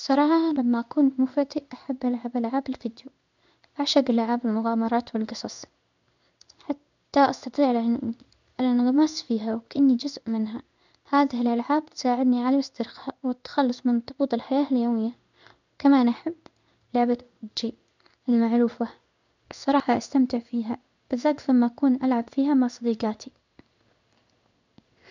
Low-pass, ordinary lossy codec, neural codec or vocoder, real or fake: 7.2 kHz; none; codec, 16 kHz in and 24 kHz out, 1 kbps, XY-Tokenizer; fake